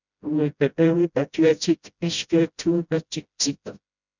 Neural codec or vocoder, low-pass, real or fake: codec, 16 kHz, 0.5 kbps, FreqCodec, smaller model; 7.2 kHz; fake